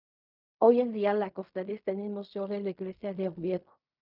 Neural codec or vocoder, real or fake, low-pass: codec, 16 kHz in and 24 kHz out, 0.4 kbps, LongCat-Audio-Codec, fine tuned four codebook decoder; fake; 5.4 kHz